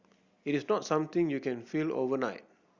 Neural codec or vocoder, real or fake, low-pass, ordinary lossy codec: none; real; 7.2 kHz; Opus, 64 kbps